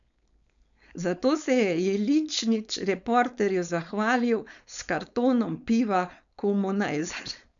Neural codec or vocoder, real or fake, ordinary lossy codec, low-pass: codec, 16 kHz, 4.8 kbps, FACodec; fake; none; 7.2 kHz